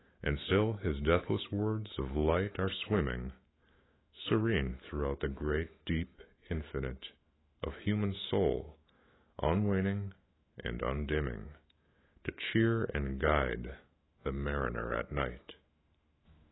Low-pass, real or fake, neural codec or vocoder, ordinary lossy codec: 7.2 kHz; fake; autoencoder, 48 kHz, 128 numbers a frame, DAC-VAE, trained on Japanese speech; AAC, 16 kbps